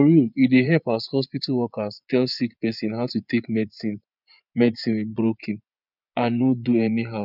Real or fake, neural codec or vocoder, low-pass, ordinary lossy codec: fake; vocoder, 24 kHz, 100 mel bands, Vocos; 5.4 kHz; none